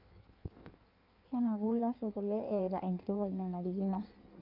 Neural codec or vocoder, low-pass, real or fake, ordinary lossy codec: codec, 16 kHz in and 24 kHz out, 1.1 kbps, FireRedTTS-2 codec; 5.4 kHz; fake; none